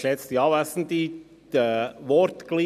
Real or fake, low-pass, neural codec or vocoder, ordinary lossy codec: real; 14.4 kHz; none; none